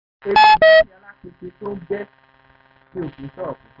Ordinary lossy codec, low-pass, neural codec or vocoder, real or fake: none; 5.4 kHz; none; real